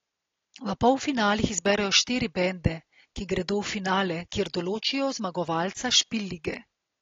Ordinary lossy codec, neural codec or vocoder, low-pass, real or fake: AAC, 32 kbps; none; 7.2 kHz; real